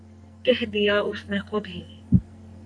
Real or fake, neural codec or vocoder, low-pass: fake; codec, 44.1 kHz, 2.6 kbps, SNAC; 9.9 kHz